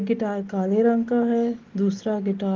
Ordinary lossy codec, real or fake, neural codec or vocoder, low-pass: Opus, 16 kbps; real; none; 7.2 kHz